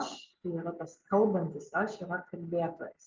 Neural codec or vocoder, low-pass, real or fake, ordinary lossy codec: none; 7.2 kHz; real; Opus, 24 kbps